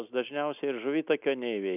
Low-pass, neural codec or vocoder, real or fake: 3.6 kHz; none; real